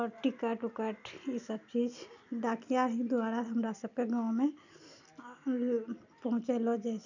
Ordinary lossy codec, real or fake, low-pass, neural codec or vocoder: none; real; 7.2 kHz; none